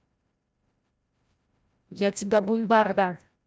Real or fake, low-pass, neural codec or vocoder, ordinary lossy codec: fake; none; codec, 16 kHz, 0.5 kbps, FreqCodec, larger model; none